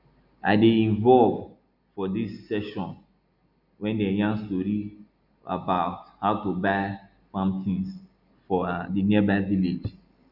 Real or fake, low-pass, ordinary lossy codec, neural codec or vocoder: real; 5.4 kHz; none; none